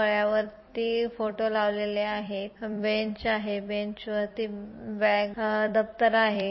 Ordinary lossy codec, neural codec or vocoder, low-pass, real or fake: MP3, 24 kbps; vocoder, 44.1 kHz, 128 mel bands every 256 samples, BigVGAN v2; 7.2 kHz; fake